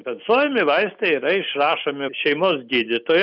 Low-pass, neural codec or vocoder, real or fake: 5.4 kHz; none; real